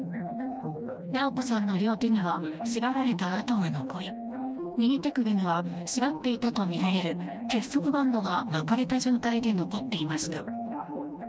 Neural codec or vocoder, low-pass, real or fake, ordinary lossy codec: codec, 16 kHz, 1 kbps, FreqCodec, smaller model; none; fake; none